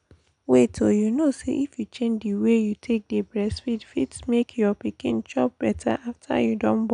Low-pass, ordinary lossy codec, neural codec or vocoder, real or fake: 9.9 kHz; none; none; real